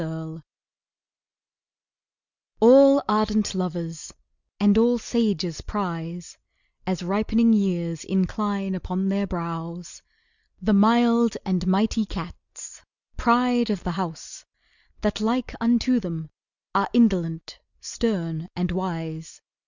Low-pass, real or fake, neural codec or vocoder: 7.2 kHz; real; none